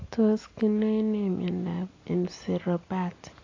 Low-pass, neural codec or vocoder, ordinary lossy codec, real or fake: 7.2 kHz; none; AAC, 32 kbps; real